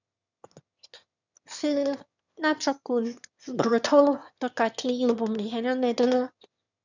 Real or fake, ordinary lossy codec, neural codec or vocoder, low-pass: fake; none; autoencoder, 22.05 kHz, a latent of 192 numbers a frame, VITS, trained on one speaker; 7.2 kHz